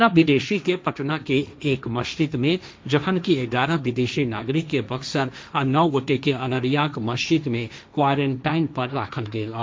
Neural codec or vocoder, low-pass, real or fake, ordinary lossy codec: codec, 16 kHz, 1.1 kbps, Voila-Tokenizer; none; fake; none